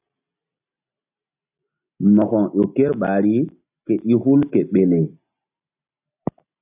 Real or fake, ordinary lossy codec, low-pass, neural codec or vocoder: real; AAC, 32 kbps; 3.6 kHz; none